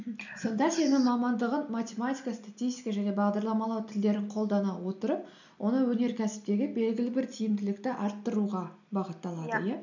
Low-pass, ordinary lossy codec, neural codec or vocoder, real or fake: 7.2 kHz; none; none; real